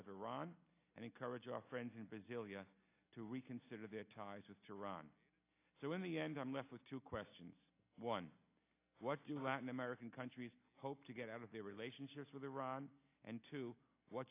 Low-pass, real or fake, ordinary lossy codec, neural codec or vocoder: 3.6 kHz; real; AAC, 24 kbps; none